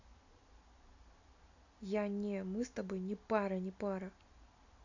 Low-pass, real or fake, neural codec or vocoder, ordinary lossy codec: 7.2 kHz; real; none; AAC, 48 kbps